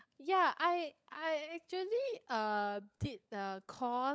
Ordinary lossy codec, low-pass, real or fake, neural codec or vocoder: none; none; fake; codec, 16 kHz, 16 kbps, FunCodec, trained on LibriTTS, 50 frames a second